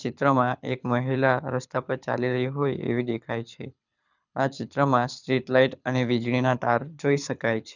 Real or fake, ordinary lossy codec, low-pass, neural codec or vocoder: fake; none; 7.2 kHz; codec, 24 kHz, 6 kbps, HILCodec